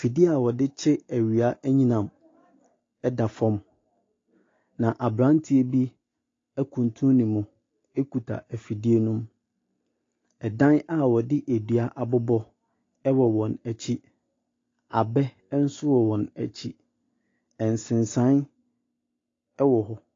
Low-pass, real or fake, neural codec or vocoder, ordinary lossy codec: 7.2 kHz; real; none; AAC, 32 kbps